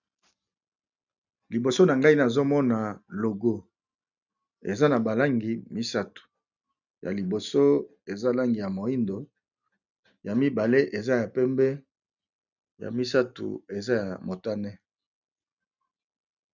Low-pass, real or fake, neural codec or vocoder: 7.2 kHz; real; none